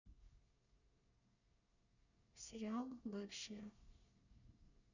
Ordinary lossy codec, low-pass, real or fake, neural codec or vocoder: none; 7.2 kHz; fake; codec, 24 kHz, 1 kbps, SNAC